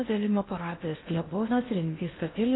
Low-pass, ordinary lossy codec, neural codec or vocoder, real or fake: 7.2 kHz; AAC, 16 kbps; codec, 16 kHz in and 24 kHz out, 0.6 kbps, FocalCodec, streaming, 2048 codes; fake